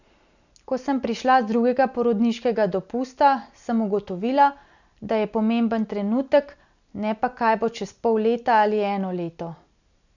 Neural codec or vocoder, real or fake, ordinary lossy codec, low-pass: none; real; none; 7.2 kHz